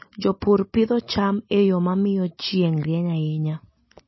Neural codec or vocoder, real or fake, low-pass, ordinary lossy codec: none; real; 7.2 kHz; MP3, 24 kbps